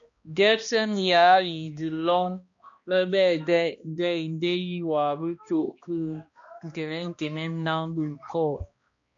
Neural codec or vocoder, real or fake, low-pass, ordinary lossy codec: codec, 16 kHz, 1 kbps, X-Codec, HuBERT features, trained on balanced general audio; fake; 7.2 kHz; MP3, 48 kbps